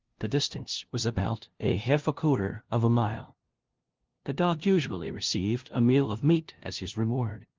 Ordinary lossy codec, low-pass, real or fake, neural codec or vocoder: Opus, 16 kbps; 7.2 kHz; fake; codec, 16 kHz, 0.5 kbps, FunCodec, trained on LibriTTS, 25 frames a second